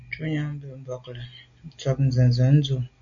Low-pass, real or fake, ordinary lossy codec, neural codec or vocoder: 7.2 kHz; real; MP3, 64 kbps; none